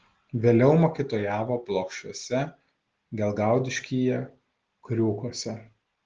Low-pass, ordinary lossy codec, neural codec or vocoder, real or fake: 7.2 kHz; Opus, 16 kbps; none; real